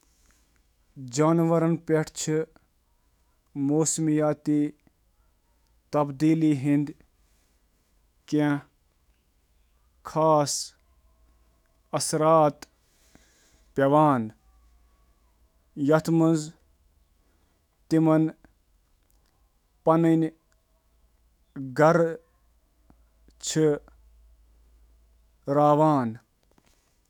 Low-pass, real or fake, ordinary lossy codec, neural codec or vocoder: 19.8 kHz; fake; none; autoencoder, 48 kHz, 128 numbers a frame, DAC-VAE, trained on Japanese speech